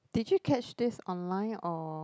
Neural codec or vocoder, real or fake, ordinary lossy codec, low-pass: none; real; none; none